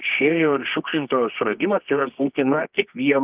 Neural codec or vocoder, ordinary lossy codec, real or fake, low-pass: codec, 24 kHz, 0.9 kbps, WavTokenizer, medium music audio release; Opus, 24 kbps; fake; 3.6 kHz